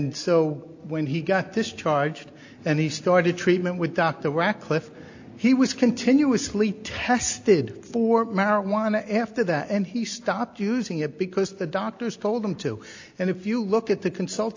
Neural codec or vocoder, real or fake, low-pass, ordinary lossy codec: none; real; 7.2 kHz; AAC, 48 kbps